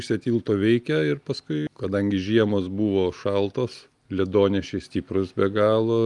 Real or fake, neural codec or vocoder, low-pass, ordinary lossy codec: real; none; 10.8 kHz; Opus, 32 kbps